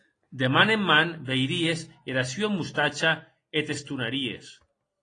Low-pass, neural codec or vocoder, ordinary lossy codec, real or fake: 9.9 kHz; none; AAC, 32 kbps; real